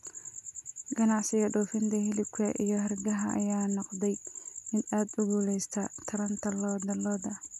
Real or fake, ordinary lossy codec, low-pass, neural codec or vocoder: real; none; 14.4 kHz; none